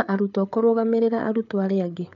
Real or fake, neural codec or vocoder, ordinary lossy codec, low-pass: fake; codec, 16 kHz, 8 kbps, FreqCodec, larger model; none; 7.2 kHz